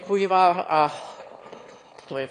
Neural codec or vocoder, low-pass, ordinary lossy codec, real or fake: autoencoder, 22.05 kHz, a latent of 192 numbers a frame, VITS, trained on one speaker; 9.9 kHz; AAC, 48 kbps; fake